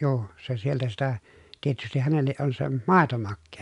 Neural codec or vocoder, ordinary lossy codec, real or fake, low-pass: none; MP3, 96 kbps; real; 10.8 kHz